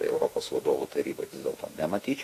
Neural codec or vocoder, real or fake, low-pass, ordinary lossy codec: autoencoder, 48 kHz, 32 numbers a frame, DAC-VAE, trained on Japanese speech; fake; 14.4 kHz; AAC, 48 kbps